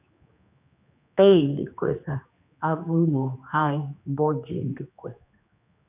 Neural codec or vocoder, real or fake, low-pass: codec, 16 kHz, 2 kbps, X-Codec, HuBERT features, trained on general audio; fake; 3.6 kHz